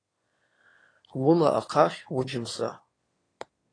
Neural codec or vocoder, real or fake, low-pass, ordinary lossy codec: autoencoder, 22.05 kHz, a latent of 192 numbers a frame, VITS, trained on one speaker; fake; 9.9 kHz; AAC, 32 kbps